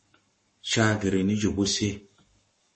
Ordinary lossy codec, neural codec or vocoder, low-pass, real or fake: MP3, 32 kbps; codec, 44.1 kHz, 7.8 kbps, Pupu-Codec; 10.8 kHz; fake